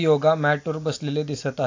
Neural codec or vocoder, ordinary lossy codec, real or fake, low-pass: none; AAC, 48 kbps; real; 7.2 kHz